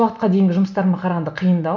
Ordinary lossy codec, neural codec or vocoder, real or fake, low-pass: none; none; real; 7.2 kHz